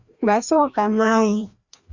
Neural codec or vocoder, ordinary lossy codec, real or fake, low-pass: codec, 16 kHz, 1 kbps, FreqCodec, larger model; Opus, 64 kbps; fake; 7.2 kHz